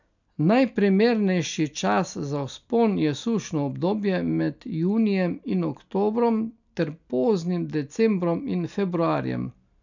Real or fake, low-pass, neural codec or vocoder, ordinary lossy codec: real; 7.2 kHz; none; none